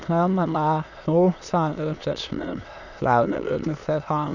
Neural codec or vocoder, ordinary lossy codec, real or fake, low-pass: autoencoder, 22.05 kHz, a latent of 192 numbers a frame, VITS, trained on many speakers; none; fake; 7.2 kHz